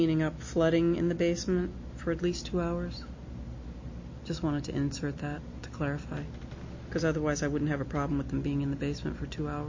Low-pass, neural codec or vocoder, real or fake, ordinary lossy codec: 7.2 kHz; none; real; MP3, 32 kbps